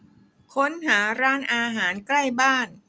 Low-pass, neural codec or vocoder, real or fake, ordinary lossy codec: none; none; real; none